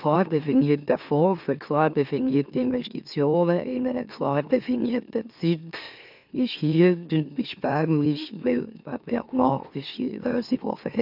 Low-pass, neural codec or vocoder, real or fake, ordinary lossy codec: 5.4 kHz; autoencoder, 44.1 kHz, a latent of 192 numbers a frame, MeloTTS; fake; none